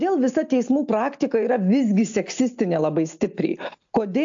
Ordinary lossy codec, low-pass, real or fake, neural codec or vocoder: AAC, 64 kbps; 7.2 kHz; real; none